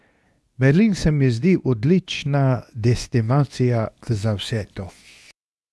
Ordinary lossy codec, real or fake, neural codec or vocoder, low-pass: none; fake; codec, 24 kHz, 0.9 kbps, WavTokenizer, medium speech release version 2; none